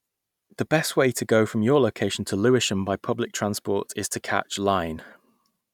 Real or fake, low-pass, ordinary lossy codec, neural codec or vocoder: real; 19.8 kHz; none; none